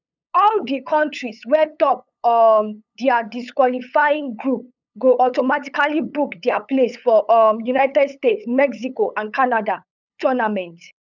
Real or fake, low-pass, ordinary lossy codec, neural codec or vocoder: fake; 7.2 kHz; none; codec, 16 kHz, 8 kbps, FunCodec, trained on LibriTTS, 25 frames a second